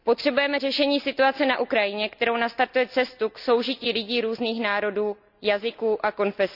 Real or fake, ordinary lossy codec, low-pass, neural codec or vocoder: real; none; 5.4 kHz; none